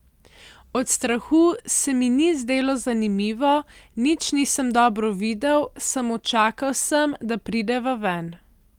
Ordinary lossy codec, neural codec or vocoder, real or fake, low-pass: Opus, 32 kbps; none; real; 19.8 kHz